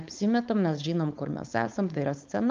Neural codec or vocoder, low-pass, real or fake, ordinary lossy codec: codec, 16 kHz, 4.8 kbps, FACodec; 7.2 kHz; fake; Opus, 24 kbps